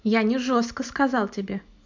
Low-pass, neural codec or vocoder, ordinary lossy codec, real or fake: 7.2 kHz; none; MP3, 64 kbps; real